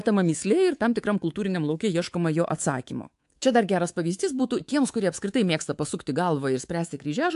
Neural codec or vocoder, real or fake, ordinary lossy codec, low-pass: codec, 24 kHz, 3.1 kbps, DualCodec; fake; AAC, 64 kbps; 10.8 kHz